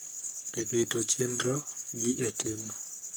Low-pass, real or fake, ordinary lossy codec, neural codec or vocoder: none; fake; none; codec, 44.1 kHz, 3.4 kbps, Pupu-Codec